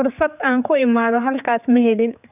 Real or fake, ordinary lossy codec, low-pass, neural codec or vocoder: fake; none; 3.6 kHz; codec, 16 kHz, 4 kbps, X-Codec, HuBERT features, trained on general audio